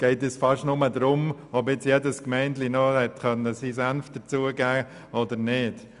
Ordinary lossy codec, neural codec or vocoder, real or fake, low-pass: none; none; real; 10.8 kHz